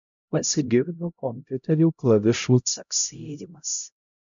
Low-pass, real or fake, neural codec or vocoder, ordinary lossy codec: 7.2 kHz; fake; codec, 16 kHz, 0.5 kbps, X-Codec, HuBERT features, trained on LibriSpeech; MP3, 64 kbps